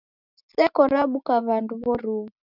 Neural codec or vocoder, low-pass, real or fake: none; 5.4 kHz; real